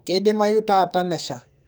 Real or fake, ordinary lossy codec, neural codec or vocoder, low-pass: fake; none; codec, 44.1 kHz, 2.6 kbps, SNAC; none